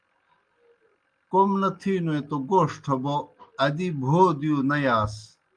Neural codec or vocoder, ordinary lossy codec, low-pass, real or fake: none; Opus, 32 kbps; 9.9 kHz; real